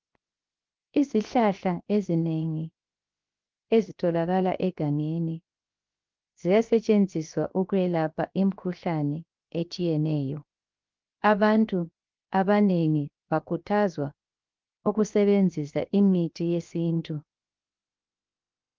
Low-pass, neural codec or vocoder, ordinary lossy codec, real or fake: 7.2 kHz; codec, 16 kHz, 0.7 kbps, FocalCodec; Opus, 32 kbps; fake